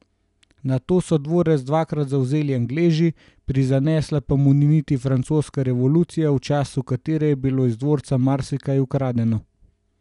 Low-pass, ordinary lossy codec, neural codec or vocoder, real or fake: 10.8 kHz; none; none; real